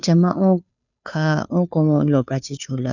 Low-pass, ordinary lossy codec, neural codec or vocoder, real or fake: 7.2 kHz; none; codec, 16 kHz, 2 kbps, FunCodec, trained on Chinese and English, 25 frames a second; fake